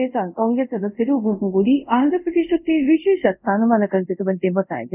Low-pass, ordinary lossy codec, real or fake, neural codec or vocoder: 3.6 kHz; none; fake; codec, 24 kHz, 0.5 kbps, DualCodec